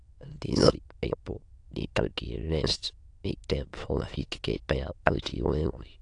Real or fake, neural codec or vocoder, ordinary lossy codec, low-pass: fake; autoencoder, 22.05 kHz, a latent of 192 numbers a frame, VITS, trained on many speakers; AAC, 48 kbps; 9.9 kHz